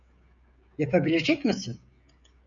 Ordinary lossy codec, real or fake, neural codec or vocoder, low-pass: MP3, 64 kbps; fake; codec, 16 kHz, 16 kbps, FreqCodec, smaller model; 7.2 kHz